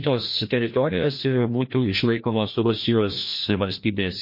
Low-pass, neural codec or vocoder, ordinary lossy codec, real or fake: 5.4 kHz; codec, 16 kHz, 1 kbps, FreqCodec, larger model; MP3, 32 kbps; fake